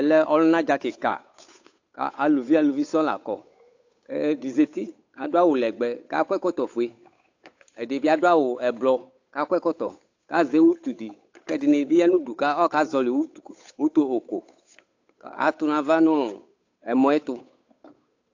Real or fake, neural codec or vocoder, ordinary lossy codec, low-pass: fake; codec, 16 kHz, 8 kbps, FunCodec, trained on Chinese and English, 25 frames a second; AAC, 48 kbps; 7.2 kHz